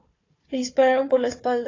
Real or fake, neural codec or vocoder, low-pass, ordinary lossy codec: fake; codec, 16 kHz, 4 kbps, FunCodec, trained on Chinese and English, 50 frames a second; 7.2 kHz; AAC, 32 kbps